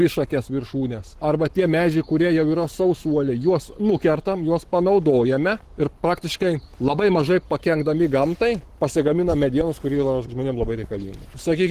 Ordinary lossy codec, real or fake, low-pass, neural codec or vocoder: Opus, 16 kbps; fake; 14.4 kHz; codec, 44.1 kHz, 7.8 kbps, Pupu-Codec